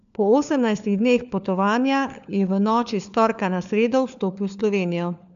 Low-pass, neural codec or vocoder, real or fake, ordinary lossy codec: 7.2 kHz; codec, 16 kHz, 4 kbps, FunCodec, trained on LibriTTS, 50 frames a second; fake; none